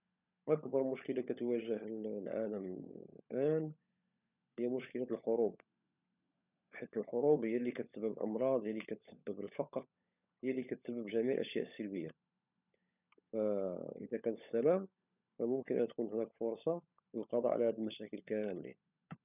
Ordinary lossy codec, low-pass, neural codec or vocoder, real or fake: none; 3.6 kHz; codec, 16 kHz, 16 kbps, FunCodec, trained on LibriTTS, 50 frames a second; fake